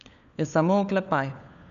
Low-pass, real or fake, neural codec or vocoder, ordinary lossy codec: 7.2 kHz; fake; codec, 16 kHz, 4 kbps, FunCodec, trained on LibriTTS, 50 frames a second; none